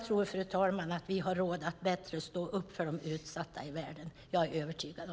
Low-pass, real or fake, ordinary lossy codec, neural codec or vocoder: none; real; none; none